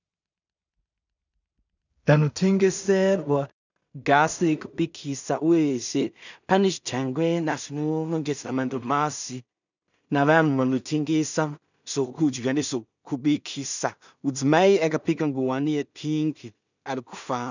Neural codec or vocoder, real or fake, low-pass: codec, 16 kHz in and 24 kHz out, 0.4 kbps, LongCat-Audio-Codec, two codebook decoder; fake; 7.2 kHz